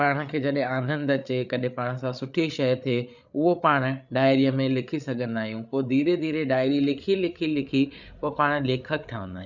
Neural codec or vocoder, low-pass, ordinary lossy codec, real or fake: codec, 16 kHz, 8 kbps, FreqCodec, larger model; 7.2 kHz; none; fake